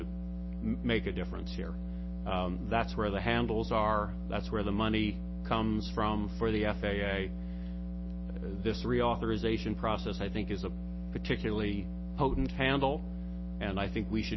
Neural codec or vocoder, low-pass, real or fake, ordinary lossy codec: none; 7.2 kHz; real; MP3, 24 kbps